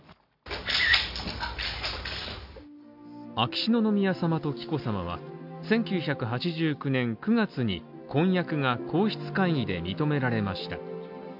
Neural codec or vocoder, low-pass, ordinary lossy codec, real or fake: none; 5.4 kHz; AAC, 48 kbps; real